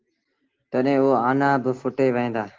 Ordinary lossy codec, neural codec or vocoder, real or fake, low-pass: Opus, 16 kbps; none; real; 7.2 kHz